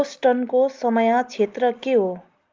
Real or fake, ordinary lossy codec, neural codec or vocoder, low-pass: real; Opus, 24 kbps; none; 7.2 kHz